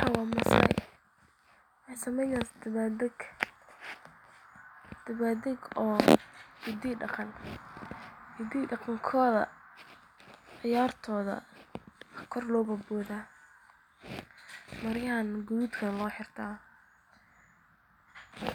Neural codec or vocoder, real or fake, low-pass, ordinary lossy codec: none; real; 19.8 kHz; none